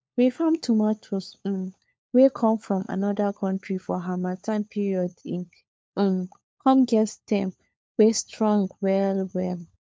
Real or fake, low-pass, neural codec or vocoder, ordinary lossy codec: fake; none; codec, 16 kHz, 4 kbps, FunCodec, trained on LibriTTS, 50 frames a second; none